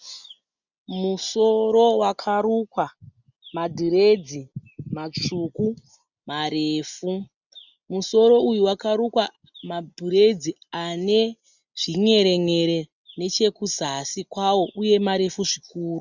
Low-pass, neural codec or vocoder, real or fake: 7.2 kHz; none; real